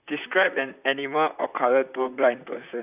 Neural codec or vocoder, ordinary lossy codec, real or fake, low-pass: vocoder, 44.1 kHz, 128 mel bands, Pupu-Vocoder; none; fake; 3.6 kHz